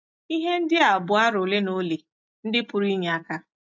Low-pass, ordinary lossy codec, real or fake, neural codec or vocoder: 7.2 kHz; AAC, 48 kbps; real; none